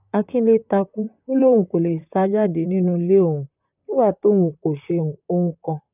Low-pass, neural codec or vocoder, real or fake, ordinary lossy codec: 3.6 kHz; vocoder, 44.1 kHz, 128 mel bands, Pupu-Vocoder; fake; none